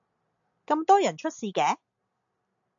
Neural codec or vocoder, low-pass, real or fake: none; 7.2 kHz; real